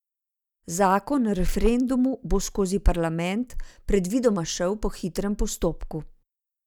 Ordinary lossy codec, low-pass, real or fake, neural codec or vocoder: none; 19.8 kHz; real; none